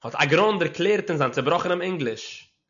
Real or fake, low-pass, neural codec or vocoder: real; 7.2 kHz; none